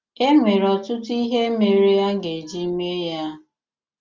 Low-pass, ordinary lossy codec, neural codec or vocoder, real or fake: 7.2 kHz; Opus, 32 kbps; none; real